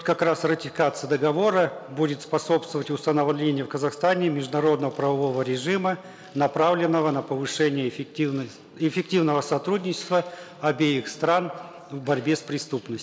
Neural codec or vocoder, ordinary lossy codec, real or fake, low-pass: none; none; real; none